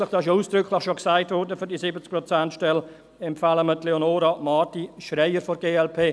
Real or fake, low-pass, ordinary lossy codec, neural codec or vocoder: real; none; none; none